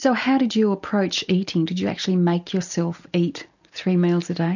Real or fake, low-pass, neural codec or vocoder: real; 7.2 kHz; none